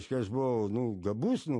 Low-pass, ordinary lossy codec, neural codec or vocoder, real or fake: 10.8 kHz; MP3, 48 kbps; none; real